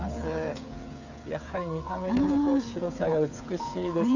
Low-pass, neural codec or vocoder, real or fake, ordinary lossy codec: 7.2 kHz; codec, 16 kHz, 8 kbps, FreqCodec, smaller model; fake; none